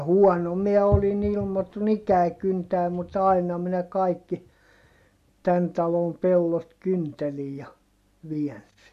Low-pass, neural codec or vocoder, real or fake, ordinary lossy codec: 14.4 kHz; none; real; MP3, 64 kbps